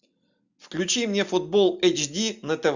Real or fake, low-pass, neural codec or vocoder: real; 7.2 kHz; none